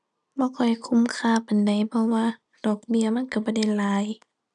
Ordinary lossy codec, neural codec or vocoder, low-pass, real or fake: none; none; 10.8 kHz; real